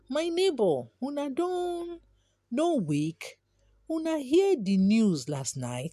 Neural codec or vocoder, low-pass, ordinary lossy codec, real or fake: none; 14.4 kHz; none; real